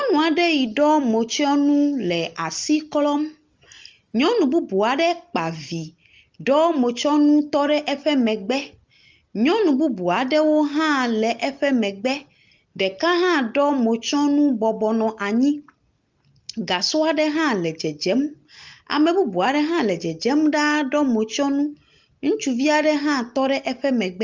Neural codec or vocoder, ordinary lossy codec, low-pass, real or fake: none; Opus, 32 kbps; 7.2 kHz; real